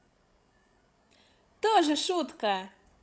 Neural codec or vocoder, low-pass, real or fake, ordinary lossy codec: codec, 16 kHz, 16 kbps, FreqCodec, larger model; none; fake; none